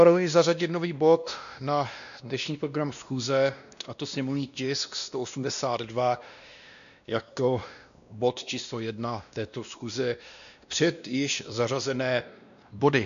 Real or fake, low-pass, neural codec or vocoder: fake; 7.2 kHz; codec, 16 kHz, 1 kbps, X-Codec, WavLM features, trained on Multilingual LibriSpeech